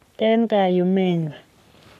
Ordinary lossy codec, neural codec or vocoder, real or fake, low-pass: none; codec, 44.1 kHz, 3.4 kbps, Pupu-Codec; fake; 14.4 kHz